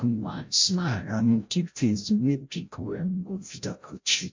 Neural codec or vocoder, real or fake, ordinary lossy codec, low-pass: codec, 16 kHz, 0.5 kbps, FreqCodec, larger model; fake; MP3, 48 kbps; 7.2 kHz